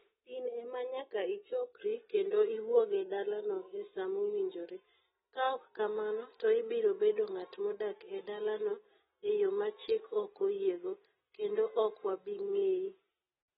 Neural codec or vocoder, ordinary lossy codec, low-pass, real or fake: none; AAC, 16 kbps; 7.2 kHz; real